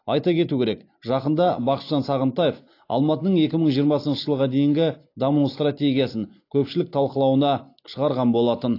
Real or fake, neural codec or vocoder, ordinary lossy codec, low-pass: real; none; AAC, 32 kbps; 5.4 kHz